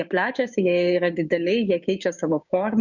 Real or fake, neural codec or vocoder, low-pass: fake; codec, 16 kHz, 6 kbps, DAC; 7.2 kHz